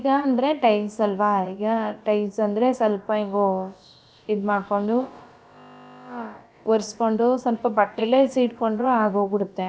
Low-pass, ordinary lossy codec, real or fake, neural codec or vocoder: none; none; fake; codec, 16 kHz, about 1 kbps, DyCAST, with the encoder's durations